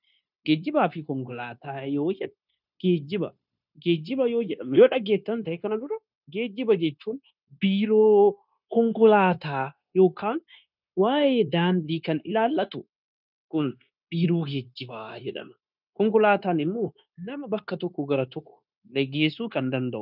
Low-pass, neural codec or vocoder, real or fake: 5.4 kHz; codec, 16 kHz, 0.9 kbps, LongCat-Audio-Codec; fake